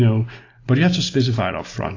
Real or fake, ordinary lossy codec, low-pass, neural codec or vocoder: real; AAC, 32 kbps; 7.2 kHz; none